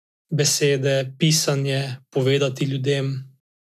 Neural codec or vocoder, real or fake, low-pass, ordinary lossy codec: none; real; 14.4 kHz; none